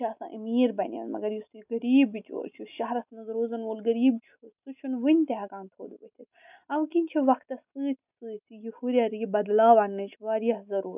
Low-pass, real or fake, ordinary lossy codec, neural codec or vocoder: 3.6 kHz; real; none; none